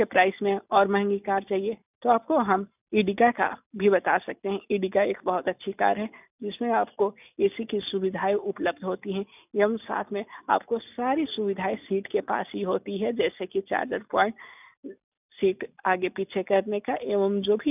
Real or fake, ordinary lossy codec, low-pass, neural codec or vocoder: real; none; 3.6 kHz; none